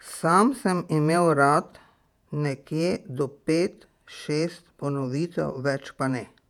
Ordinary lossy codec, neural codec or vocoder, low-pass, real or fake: none; vocoder, 48 kHz, 128 mel bands, Vocos; 19.8 kHz; fake